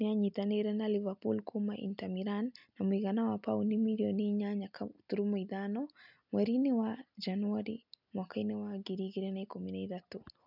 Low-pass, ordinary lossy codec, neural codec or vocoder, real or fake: 5.4 kHz; none; none; real